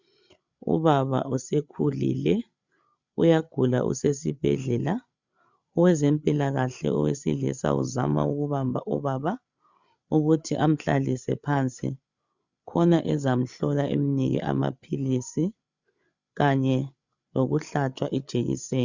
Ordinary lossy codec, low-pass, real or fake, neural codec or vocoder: Opus, 64 kbps; 7.2 kHz; fake; codec, 16 kHz, 8 kbps, FreqCodec, larger model